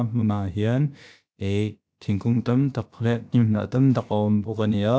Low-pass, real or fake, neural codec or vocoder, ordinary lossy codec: none; fake; codec, 16 kHz, about 1 kbps, DyCAST, with the encoder's durations; none